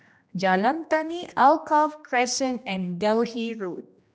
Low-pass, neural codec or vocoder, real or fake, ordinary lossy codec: none; codec, 16 kHz, 1 kbps, X-Codec, HuBERT features, trained on general audio; fake; none